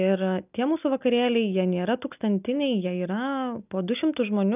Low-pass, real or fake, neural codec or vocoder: 3.6 kHz; real; none